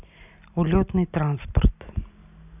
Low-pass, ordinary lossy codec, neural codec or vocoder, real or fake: 3.6 kHz; none; none; real